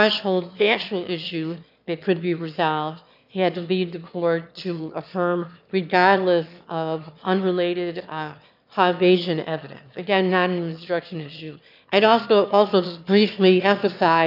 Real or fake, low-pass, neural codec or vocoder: fake; 5.4 kHz; autoencoder, 22.05 kHz, a latent of 192 numbers a frame, VITS, trained on one speaker